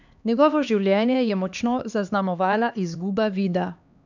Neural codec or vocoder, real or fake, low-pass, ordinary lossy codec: codec, 16 kHz, 2 kbps, X-Codec, HuBERT features, trained on LibriSpeech; fake; 7.2 kHz; none